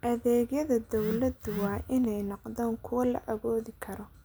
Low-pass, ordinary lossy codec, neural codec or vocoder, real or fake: none; none; none; real